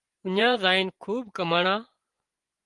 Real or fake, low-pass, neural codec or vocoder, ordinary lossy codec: fake; 10.8 kHz; vocoder, 24 kHz, 100 mel bands, Vocos; Opus, 24 kbps